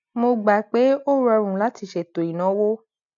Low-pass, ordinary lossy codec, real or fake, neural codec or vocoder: 7.2 kHz; none; real; none